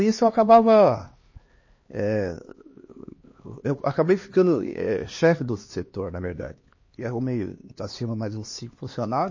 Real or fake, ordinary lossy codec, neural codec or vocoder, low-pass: fake; MP3, 32 kbps; codec, 16 kHz, 2 kbps, X-Codec, HuBERT features, trained on LibriSpeech; 7.2 kHz